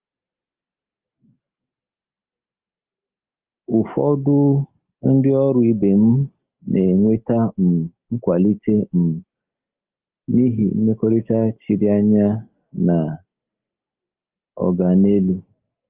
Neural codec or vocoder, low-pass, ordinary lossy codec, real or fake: none; 3.6 kHz; Opus, 24 kbps; real